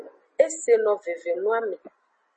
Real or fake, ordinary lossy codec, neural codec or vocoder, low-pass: real; MP3, 32 kbps; none; 10.8 kHz